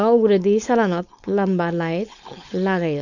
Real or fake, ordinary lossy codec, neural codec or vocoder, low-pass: fake; none; codec, 16 kHz, 4.8 kbps, FACodec; 7.2 kHz